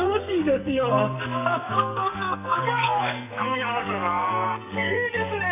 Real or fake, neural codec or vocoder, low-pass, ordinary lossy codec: fake; codec, 44.1 kHz, 2.6 kbps, SNAC; 3.6 kHz; none